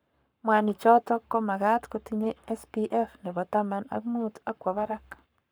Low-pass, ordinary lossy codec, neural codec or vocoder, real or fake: none; none; codec, 44.1 kHz, 7.8 kbps, Pupu-Codec; fake